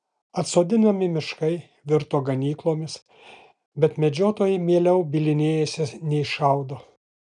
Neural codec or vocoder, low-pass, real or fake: none; 10.8 kHz; real